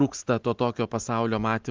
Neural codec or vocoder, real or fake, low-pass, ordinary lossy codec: none; real; 7.2 kHz; Opus, 32 kbps